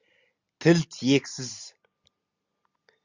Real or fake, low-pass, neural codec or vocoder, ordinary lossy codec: real; 7.2 kHz; none; Opus, 64 kbps